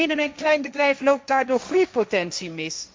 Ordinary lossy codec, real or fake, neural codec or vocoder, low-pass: none; fake; codec, 16 kHz, 1.1 kbps, Voila-Tokenizer; none